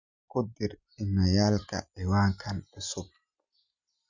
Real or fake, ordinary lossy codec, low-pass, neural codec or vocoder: real; Opus, 64 kbps; 7.2 kHz; none